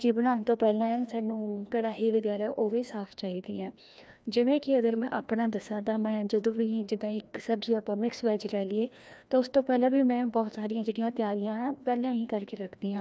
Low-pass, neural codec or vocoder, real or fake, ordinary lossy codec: none; codec, 16 kHz, 1 kbps, FreqCodec, larger model; fake; none